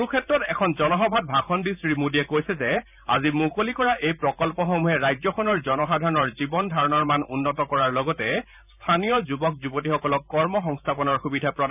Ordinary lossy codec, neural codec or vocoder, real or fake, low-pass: none; vocoder, 44.1 kHz, 128 mel bands every 512 samples, BigVGAN v2; fake; 3.6 kHz